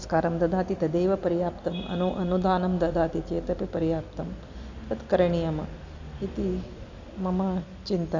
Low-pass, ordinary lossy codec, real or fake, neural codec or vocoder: 7.2 kHz; AAC, 48 kbps; real; none